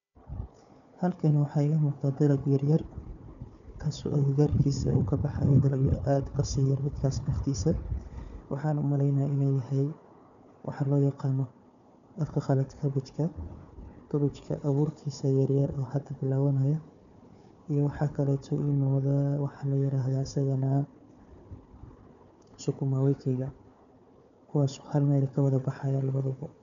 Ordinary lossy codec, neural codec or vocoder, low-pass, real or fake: none; codec, 16 kHz, 4 kbps, FunCodec, trained on Chinese and English, 50 frames a second; 7.2 kHz; fake